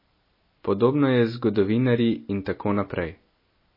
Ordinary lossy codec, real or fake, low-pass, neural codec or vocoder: MP3, 24 kbps; real; 5.4 kHz; none